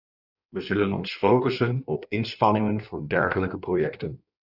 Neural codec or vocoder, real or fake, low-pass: codec, 16 kHz in and 24 kHz out, 1.1 kbps, FireRedTTS-2 codec; fake; 5.4 kHz